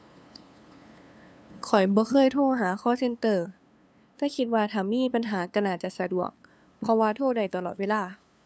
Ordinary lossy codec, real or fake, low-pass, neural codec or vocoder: none; fake; none; codec, 16 kHz, 2 kbps, FunCodec, trained on LibriTTS, 25 frames a second